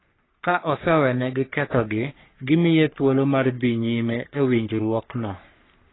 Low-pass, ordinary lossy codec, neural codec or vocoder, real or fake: 7.2 kHz; AAC, 16 kbps; codec, 44.1 kHz, 3.4 kbps, Pupu-Codec; fake